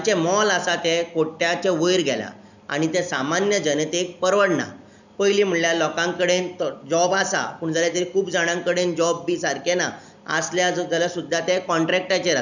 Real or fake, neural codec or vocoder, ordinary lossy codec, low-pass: real; none; none; 7.2 kHz